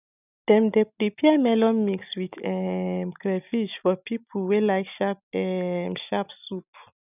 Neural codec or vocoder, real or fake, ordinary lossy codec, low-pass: none; real; none; 3.6 kHz